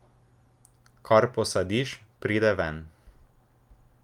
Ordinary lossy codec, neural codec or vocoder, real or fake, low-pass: Opus, 32 kbps; none; real; 19.8 kHz